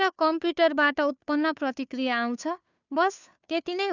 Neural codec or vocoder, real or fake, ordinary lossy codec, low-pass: codec, 16 kHz, 2 kbps, FunCodec, trained on Chinese and English, 25 frames a second; fake; none; 7.2 kHz